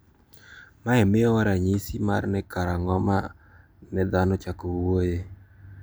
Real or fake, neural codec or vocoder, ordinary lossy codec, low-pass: real; none; none; none